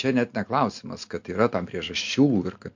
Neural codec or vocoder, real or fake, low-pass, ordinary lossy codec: none; real; 7.2 kHz; MP3, 48 kbps